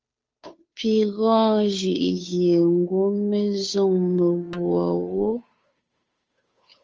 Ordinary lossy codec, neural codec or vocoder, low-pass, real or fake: Opus, 24 kbps; codec, 16 kHz, 2 kbps, FunCodec, trained on Chinese and English, 25 frames a second; 7.2 kHz; fake